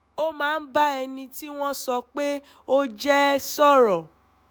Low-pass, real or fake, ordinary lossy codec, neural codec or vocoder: none; fake; none; autoencoder, 48 kHz, 128 numbers a frame, DAC-VAE, trained on Japanese speech